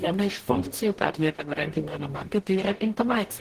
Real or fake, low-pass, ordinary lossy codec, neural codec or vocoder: fake; 14.4 kHz; Opus, 16 kbps; codec, 44.1 kHz, 0.9 kbps, DAC